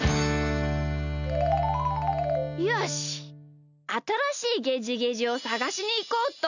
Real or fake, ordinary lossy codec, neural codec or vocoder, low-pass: real; none; none; 7.2 kHz